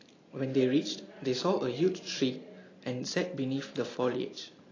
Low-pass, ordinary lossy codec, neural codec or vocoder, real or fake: 7.2 kHz; AAC, 32 kbps; none; real